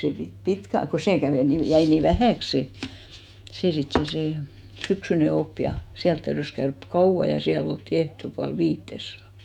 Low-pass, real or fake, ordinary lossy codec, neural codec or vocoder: 19.8 kHz; fake; none; autoencoder, 48 kHz, 128 numbers a frame, DAC-VAE, trained on Japanese speech